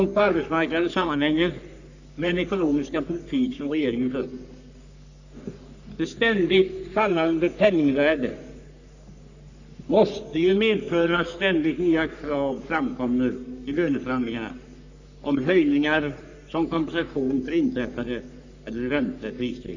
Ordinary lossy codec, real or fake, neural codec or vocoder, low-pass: none; fake; codec, 44.1 kHz, 3.4 kbps, Pupu-Codec; 7.2 kHz